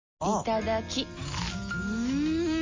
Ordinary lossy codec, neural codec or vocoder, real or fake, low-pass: MP3, 48 kbps; none; real; 7.2 kHz